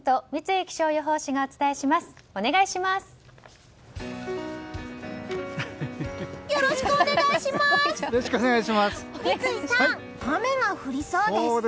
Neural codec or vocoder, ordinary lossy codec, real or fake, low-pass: none; none; real; none